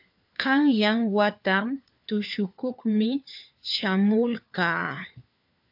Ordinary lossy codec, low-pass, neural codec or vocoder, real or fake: AAC, 48 kbps; 5.4 kHz; codec, 16 kHz, 4 kbps, FunCodec, trained on LibriTTS, 50 frames a second; fake